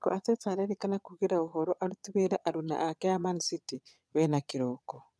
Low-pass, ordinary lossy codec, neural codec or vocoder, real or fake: none; none; vocoder, 22.05 kHz, 80 mel bands, Vocos; fake